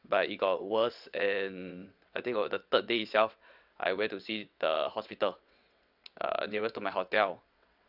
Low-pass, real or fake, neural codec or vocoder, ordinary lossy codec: 5.4 kHz; fake; vocoder, 22.05 kHz, 80 mel bands, WaveNeXt; none